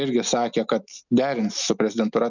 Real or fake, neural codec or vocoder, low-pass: real; none; 7.2 kHz